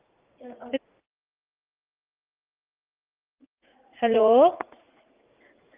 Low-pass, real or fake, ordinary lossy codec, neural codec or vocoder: 3.6 kHz; fake; Opus, 24 kbps; vocoder, 44.1 kHz, 80 mel bands, Vocos